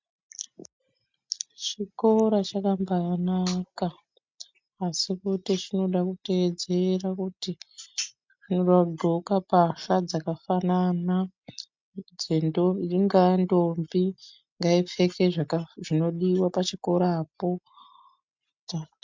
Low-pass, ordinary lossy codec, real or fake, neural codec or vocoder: 7.2 kHz; MP3, 64 kbps; real; none